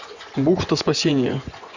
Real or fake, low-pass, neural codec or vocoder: fake; 7.2 kHz; vocoder, 24 kHz, 100 mel bands, Vocos